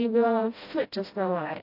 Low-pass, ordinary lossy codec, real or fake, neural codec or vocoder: 5.4 kHz; AAC, 32 kbps; fake; codec, 16 kHz, 0.5 kbps, FreqCodec, smaller model